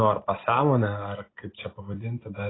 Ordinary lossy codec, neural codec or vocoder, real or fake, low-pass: AAC, 16 kbps; none; real; 7.2 kHz